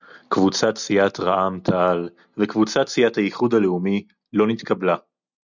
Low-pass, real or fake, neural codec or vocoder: 7.2 kHz; real; none